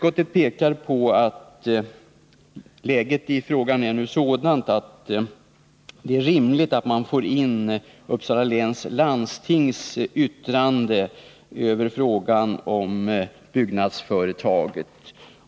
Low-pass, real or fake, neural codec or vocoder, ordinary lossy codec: none; real; none; none